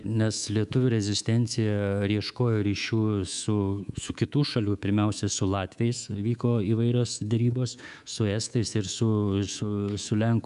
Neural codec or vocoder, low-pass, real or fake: codec, 24 kHz, 3.1 kbps, DualCodec; 10.8 kHz; fake